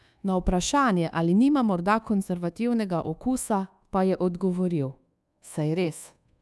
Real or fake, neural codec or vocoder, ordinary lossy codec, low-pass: fake; codec, 24 kHz, 1.2 kbps, DualCodec; none; none